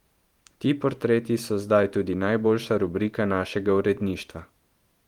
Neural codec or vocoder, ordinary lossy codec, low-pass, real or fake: vocoder, 44.1 kHz, 128 mel bands every 512 samples, BigVGAN v2; Opus, 24 kbps; 19.8 kHz; fake